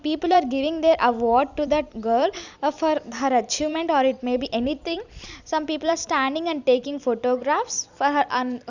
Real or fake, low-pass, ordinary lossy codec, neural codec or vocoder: fake; 7.2 kHz; none; vocoder, 44.1 kHz, 128 mel bands every 256 samples, BigVGAN v2